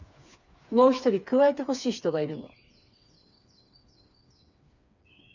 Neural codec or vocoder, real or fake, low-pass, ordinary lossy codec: codec, 16 kHz, 4 kbps, FreqCodec, smaller model; fake; 7.2 kHz; none